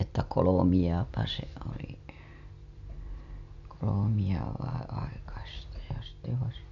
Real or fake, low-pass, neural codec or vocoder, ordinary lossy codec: real; 7.2 kHz; none; none